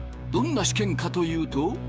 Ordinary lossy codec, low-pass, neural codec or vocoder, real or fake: none; none; codec, 16 kHz, 6 kbps, DAC; fake